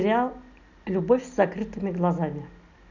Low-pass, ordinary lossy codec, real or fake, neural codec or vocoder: 7.2 kHz; none; real; none